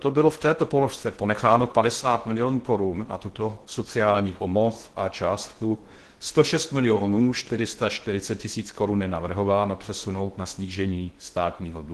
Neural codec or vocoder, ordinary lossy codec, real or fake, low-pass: codec, 16 kHz in and 24 kHz out, 0.6 kbps, FocalCodec, streaming, 2048 codes; Opus, 16 kbps; fake; 10.8 kHz